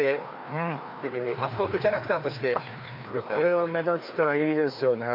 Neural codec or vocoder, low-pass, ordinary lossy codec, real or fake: codec, 16 kHz, 2 kbps, FreqCodec, larger model; 5.4 kHz; MP3, 48 kbps; fake